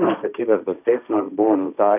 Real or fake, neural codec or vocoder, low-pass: fake; codec, 16 kHz, 1.1 kbps, Voila-Tokenizer; 3.6 kHz